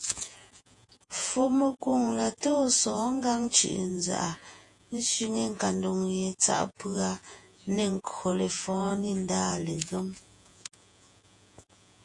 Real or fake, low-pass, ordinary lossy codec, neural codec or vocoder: fake; 10.8 kHz; AAC, 64 kbps; vocoder, 48 kHz, 128 mel bands, Vocos